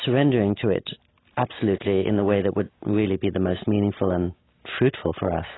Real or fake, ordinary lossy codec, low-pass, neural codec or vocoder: real; AAC, 16 kbps; 7.2 kHz; none